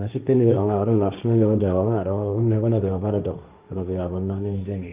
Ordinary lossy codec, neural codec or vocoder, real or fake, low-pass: Opus, 24 kbps; codec, 16 kHz, 1.1 kbps, Voila-Tokenizer; fake; 3.6 kHz